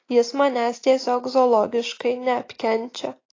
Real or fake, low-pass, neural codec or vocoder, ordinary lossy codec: real; 7.2 kHz; none; AAC, 32 kbps